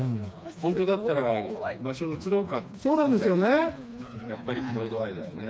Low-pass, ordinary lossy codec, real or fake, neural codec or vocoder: none; none; fake; codec, 16 kHz, 2 kbps, FreqCodec, smaller model